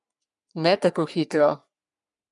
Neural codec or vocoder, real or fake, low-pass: codec, 44.1 kHz, 3.4 kbps, Pupu-Codec; fake; 10.8 kHz